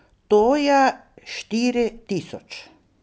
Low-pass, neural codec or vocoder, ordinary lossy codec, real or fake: none; none; none; real